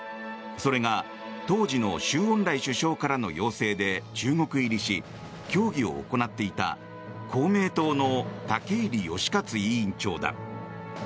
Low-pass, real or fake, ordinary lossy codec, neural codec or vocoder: none; real; none; none